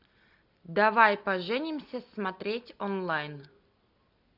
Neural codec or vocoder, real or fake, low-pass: none; real; 5.4 kHz